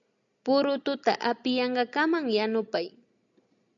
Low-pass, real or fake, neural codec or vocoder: 7.2 kHz; real; none